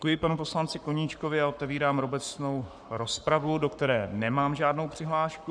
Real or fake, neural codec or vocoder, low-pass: fake; codec, 44.1 kHz, 7.8 kbps, Pupu-Codec; 9.9 kHz